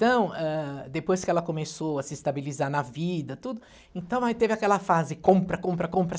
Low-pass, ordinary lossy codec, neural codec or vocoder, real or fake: none; none; none; real